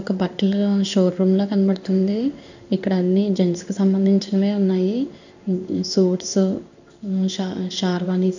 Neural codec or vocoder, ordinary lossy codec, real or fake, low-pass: codec, 16 kHz in and 24 kHz out, 1 kbps, XY-Tokenizer; none; fake; 7.2 kHz